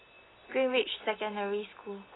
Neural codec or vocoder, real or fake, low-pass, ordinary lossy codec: none; real; 7.2 kHz; AAC, 16 kbps